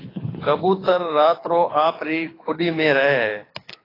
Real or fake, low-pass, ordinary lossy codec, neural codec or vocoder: fake; 5.4 kHz; AAC, 24 kbps; codec, 24 kHz, 6 kbps, HILCodec